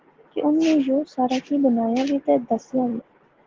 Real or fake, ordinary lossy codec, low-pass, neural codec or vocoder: real; Opus, 16 kbps; 7.2 kHz; none